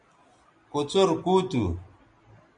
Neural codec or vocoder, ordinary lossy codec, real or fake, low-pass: none; MP3, 64 kbps; real; 9.9 kHz